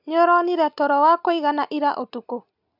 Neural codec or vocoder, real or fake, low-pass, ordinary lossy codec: none; real; 5.4 kHz; none